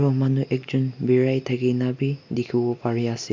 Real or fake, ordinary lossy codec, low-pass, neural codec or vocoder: real; MP3, 48 kbps; 7.2 kHz; none